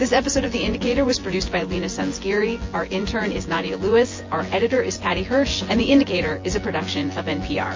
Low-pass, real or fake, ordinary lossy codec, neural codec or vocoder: 7.2 kHz; fake; MP3, 32 kbps; vocoder, 24 kHz, 100 mel bands, Vocos